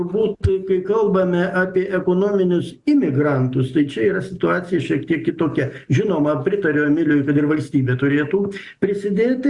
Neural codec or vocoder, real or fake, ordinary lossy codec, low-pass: none; real; Opus, 64 kbps; 10.8 kHz